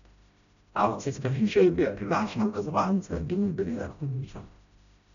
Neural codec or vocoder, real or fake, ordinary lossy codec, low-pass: codec, 16 kHz, 0.5 kbps, FreqCodec, smaller model; fake; none; 7.2 kHz